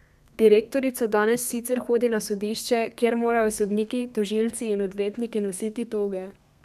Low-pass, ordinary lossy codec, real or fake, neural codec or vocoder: 14.4 kHz; none; fake; codec, 32 kHz, 1.9 kbps, SNAC